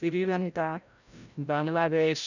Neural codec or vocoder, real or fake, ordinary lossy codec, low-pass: codec, 16 kHz, 0.5 kbps, FreqCodec, larger model; fake; none; 7.2 kHz